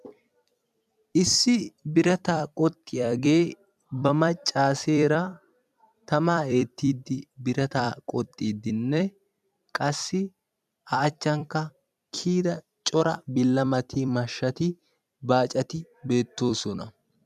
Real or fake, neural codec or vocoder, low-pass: fake; vocoder, 44.1 kHz, 128 mel bands every 256 samples, BigVGAN v2; 14.4 kHz